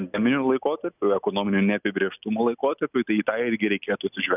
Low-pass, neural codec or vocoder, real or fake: 3.6 kHz; none; real